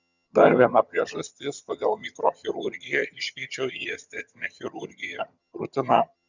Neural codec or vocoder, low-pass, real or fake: vocoder, 22.05 kHz, 80 mel bands, HiFi-GAN; 7.2 kHz; fake